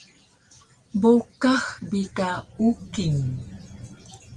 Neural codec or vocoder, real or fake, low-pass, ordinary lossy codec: none; real; 10.8 kHz; Opus, 24 kbps